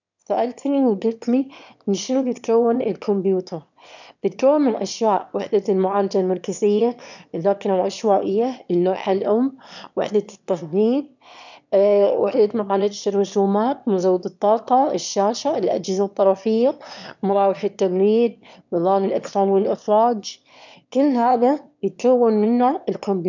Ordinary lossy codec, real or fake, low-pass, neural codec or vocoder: none; fake; 7.2 kHz; autoencoder, 22.05 kHz, a latent of 192 numbers a frame, VITS, trained on one speaker